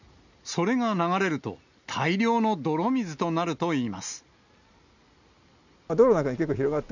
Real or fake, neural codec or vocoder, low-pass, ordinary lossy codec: real; none; 7.2 kHz; none